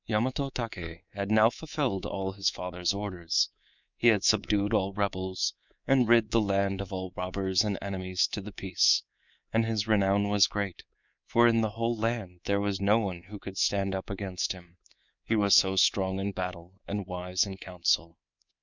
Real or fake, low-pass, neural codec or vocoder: fake; 7.2 kHz; codec, 24 kHz, 3.1 kbps, DualCodec